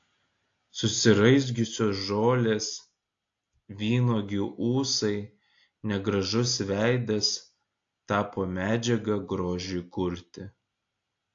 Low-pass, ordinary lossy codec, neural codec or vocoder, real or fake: 7.2 kHz; AAC, 48 kbps; none; real